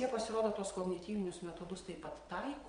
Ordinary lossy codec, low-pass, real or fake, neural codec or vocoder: MP3, 64 kbps; 9.9 kHz; fake; vocoder, 22.05 kHz, 80 mel bands, WaveNeXt